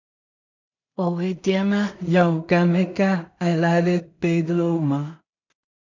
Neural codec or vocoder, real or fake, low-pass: codec, 16 kHz in and 24 kHz out, 0.4 kbps, LongCat-Audio-Codec, two codebook decoder; fake; 7.2 kHz